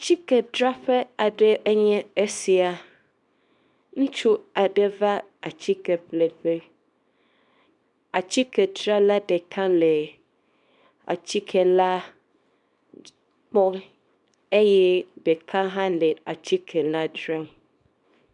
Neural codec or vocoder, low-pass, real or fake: codec, 24 kHz, 0.9 kbps, WavTokenizer, small release; 10.8 kHz; fake